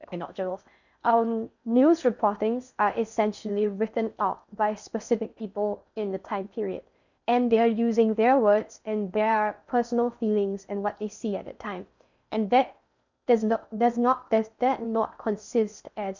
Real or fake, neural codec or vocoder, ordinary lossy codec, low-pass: fake; codec, 16 kHz in and 24 kHz out, 0.6 kbps, FocalCodec, streaming, 4096 codes; none; 7.2 kHz